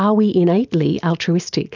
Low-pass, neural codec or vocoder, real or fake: 7.2 kHz; none; real